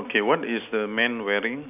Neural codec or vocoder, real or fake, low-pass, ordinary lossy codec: none; real; 3.6 kHz; none